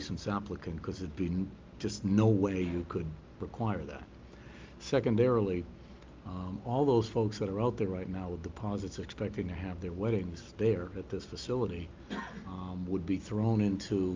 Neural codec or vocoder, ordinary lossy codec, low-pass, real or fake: none; Opus, 32 kbps; 7.2 kHz; real